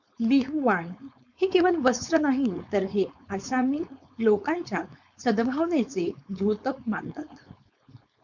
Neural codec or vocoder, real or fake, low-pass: codec, 16 kHz, 4.8 kbps, FACodec; fake; 7.2 kHz